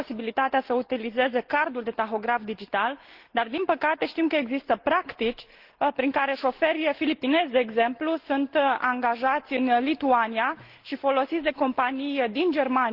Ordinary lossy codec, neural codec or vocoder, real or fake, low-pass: Opus, 16 kbps; none; real; 5.4 kHz